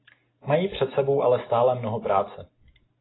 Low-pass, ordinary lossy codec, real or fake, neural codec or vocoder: 7.2 kHz; AAC, 16 kbps; real; none